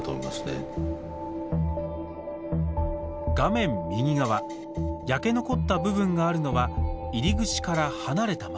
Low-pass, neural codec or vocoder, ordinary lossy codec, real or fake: none; none; none; real